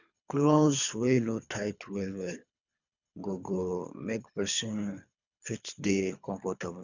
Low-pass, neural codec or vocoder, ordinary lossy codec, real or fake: 7.2 kHz; codec, 24 kHz, 3 kbps, HILCodec; none; fake